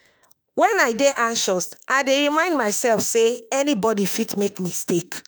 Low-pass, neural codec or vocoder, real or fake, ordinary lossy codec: none; autoencoder, 48 kHz, 32 numbers a frame, DAC-VAE, trained on Japanese speech; fake; none